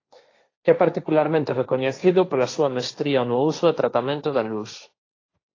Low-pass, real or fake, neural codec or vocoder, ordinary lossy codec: 7.2 kHz; fake; codec, 16 kHz, 1.1 kbps, Voila-Tokenizer; AAC, 32 kbps